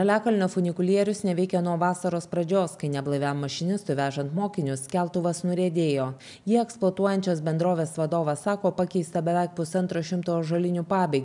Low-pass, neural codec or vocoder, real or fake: 10.8 kHz; none; real